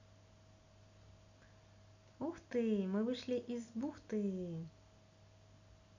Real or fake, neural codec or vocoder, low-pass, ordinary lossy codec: real; none; 7.2 kHz; none